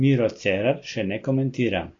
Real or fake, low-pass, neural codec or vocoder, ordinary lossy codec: real; 7.2 kHz; none; AAC, 32 kbps